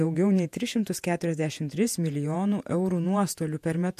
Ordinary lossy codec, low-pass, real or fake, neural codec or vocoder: MP3, 64 kbps; 14.4 kHz; fake; vocoder, 48 kHz, 128 mel bands, Vocos